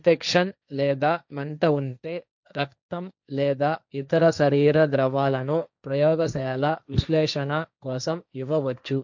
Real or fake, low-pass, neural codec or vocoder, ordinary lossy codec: fake; 7.2 kHz; codec, 16 kHz, 1.1 kbps, Voila-Tokenizer; none